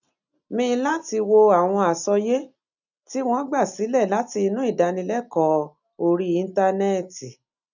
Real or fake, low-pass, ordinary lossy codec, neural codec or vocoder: real; 7.2 kHz; none; none